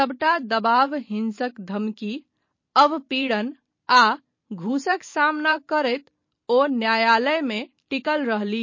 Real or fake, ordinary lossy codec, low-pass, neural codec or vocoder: fake; MP3, 32 kbps; 7.2 kHz; vocoder, 44.1 kHz, 128 mel bands every 512 samples, BigVGAN v2